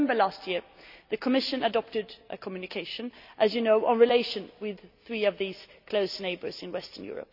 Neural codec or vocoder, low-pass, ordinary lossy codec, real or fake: none; 5.4 kHz; none; real